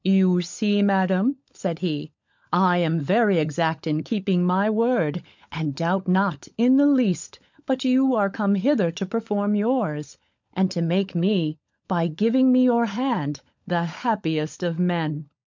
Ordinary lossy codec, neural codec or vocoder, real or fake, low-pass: MP3, 64 kbps; codec, 16 kHz, 16 kbps, FunCodec, trained on LibriTTS, 50 frames a second; fake; 7.2 kHz